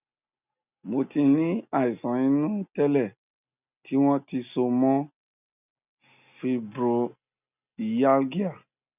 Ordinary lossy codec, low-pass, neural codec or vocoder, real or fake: none; 3.6 kHz; none; real